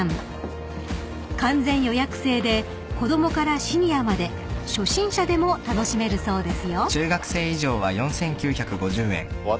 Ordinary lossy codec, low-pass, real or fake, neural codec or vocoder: none; none; real; none